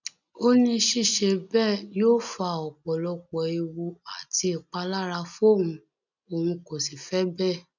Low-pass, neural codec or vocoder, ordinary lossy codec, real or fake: 7.2 kHz; none; none; real